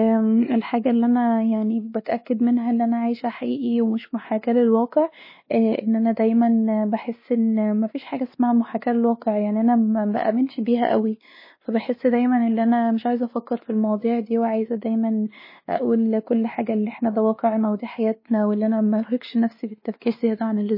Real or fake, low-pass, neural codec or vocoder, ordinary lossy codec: fake; 5.4 kHz; codec, 16 kHz, 2 kbps, X-Codec, WavLM features, trained on Multilingual LibriSpeech; MP3, 24 kbps